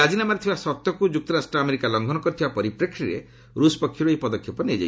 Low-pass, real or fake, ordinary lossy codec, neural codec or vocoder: none; real; none; none